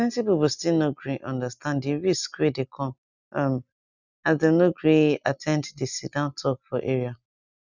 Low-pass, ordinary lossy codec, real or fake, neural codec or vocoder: 7.2 kHz; none; real; none